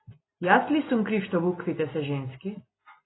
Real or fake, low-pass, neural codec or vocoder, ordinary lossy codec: real; 7.2 kHz; none; AAC, 16 kbps